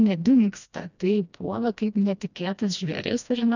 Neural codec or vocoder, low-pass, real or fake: codec, 16 kHz, 1 kbps, FreqCodec, smaller model; 7.2 kHz; fake